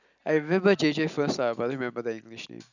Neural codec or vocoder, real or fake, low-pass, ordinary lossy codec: none; real; 7.2 kHz; none